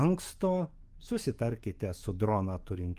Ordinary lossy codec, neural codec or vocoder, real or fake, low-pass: Opus, 32 kbps; codec, 44.1 kHz, 7.8 kbps, DAC; fake; 14.4 kHz